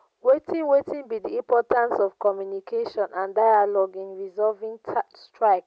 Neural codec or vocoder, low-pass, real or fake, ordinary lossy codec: none; none; real; none